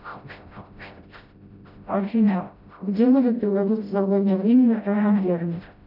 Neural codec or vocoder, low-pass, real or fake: codec, 16 kHz, 0.5 kbps, FreqCodec, smaller model; 5.4 kHz; fake